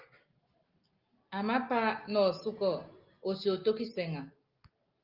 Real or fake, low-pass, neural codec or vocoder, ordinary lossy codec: real; 5.4 kHz; none; Opus, 32 kbps